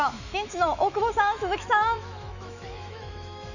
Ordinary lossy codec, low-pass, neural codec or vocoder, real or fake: none; 7.2 kHz; autoencoder, 48 kHz, 128 numbers a frame, DAC-VAE, trained on Japanese speech; fake